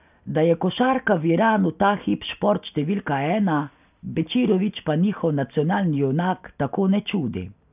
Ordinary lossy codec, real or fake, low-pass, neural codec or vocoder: none; real; 3.6 kHz; none